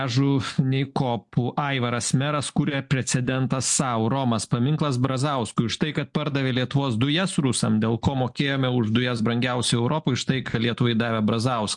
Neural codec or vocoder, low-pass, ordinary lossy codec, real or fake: none; 10.8 kHz; MP3, 64 kbps; real